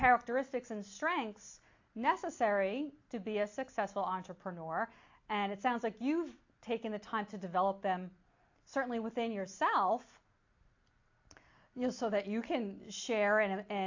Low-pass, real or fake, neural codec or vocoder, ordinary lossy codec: 7.2 kHz; real; none; AAC, 48 kbps